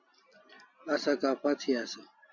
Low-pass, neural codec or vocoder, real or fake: 7.2 kHz; none; real